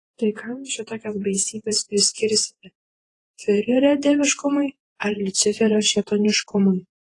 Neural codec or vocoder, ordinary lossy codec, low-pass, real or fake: vocoder, 48 kHz, 128 mel bands, Vocos; AAC, 32 kbps; 10.8 kHz; fake